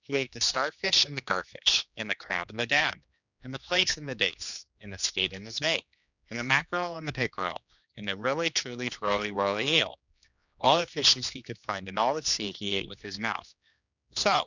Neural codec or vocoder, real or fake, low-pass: codec, 16 kHz, 2 kbps, X-Codec, HuBERT features, trained on general audio; fake; 7.2 kHz